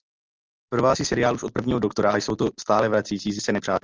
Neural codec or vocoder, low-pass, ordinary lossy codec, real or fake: none; 7.2 kHz; Opus, 24 kbps; real